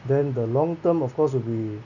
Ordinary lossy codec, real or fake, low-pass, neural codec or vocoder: none; real; 7.2 kHz; none